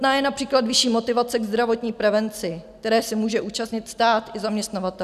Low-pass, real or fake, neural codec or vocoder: 14.4 kHz; real; none